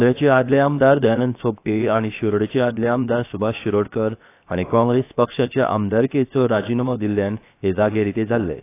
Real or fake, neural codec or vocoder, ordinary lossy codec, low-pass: fake; codec, 16 kHz, 0.7 kbps, FocalCodec; AAC, 24 kbps; 3.6 kHz